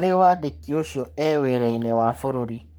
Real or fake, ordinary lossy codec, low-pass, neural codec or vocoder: fake; none; none; codec, 44.1 kHz, 3.4 kbps, Pupu-Codec